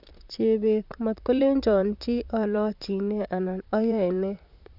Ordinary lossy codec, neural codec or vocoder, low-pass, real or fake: none; vocoder, 44.1 kHz, 128 mel bands, Pupu-Vocoder; 5.4 kHz; fake